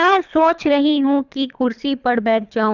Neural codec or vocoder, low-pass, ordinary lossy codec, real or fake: codec, 24 kHz, 3 kbps, HILCodec; 7.2 kHz; none; fake